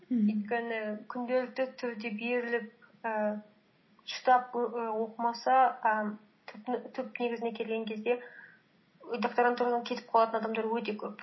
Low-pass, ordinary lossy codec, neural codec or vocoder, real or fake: 7.2 kHz; MP3, 24 kbps; none; real